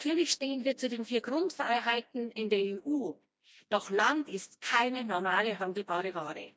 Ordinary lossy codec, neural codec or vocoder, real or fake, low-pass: none; codec, 16 kHz, 1 kbps, FreqCodec, smaller model; fake; none